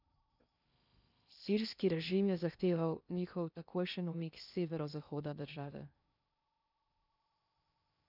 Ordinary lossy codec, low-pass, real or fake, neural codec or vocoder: none; 5.4 kHz; fake; codec, 16 kHz in and 24 kHz out, 0.6 kbps, FocalCodec, streaming, 2048 codes